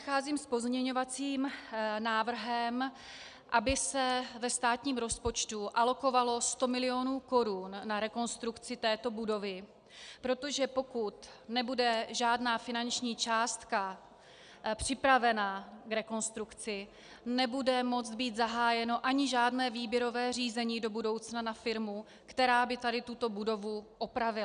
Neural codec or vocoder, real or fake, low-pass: none; real; 9.9 kHz